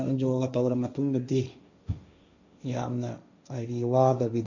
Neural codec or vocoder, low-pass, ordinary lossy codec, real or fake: codec, 16 kHz, 1.1 kbps, Voila-Tokenizer; 7.2 kHz; none; fake